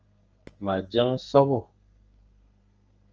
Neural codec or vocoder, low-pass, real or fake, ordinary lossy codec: codec, 32 kHz, 1.9 kbps, SNAC; 7.2 kHz; fake; Opus, 24 kbps